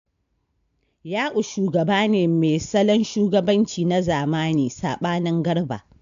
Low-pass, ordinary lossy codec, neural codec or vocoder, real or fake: 7.2 kHz; AAC, 64 kbps; none; real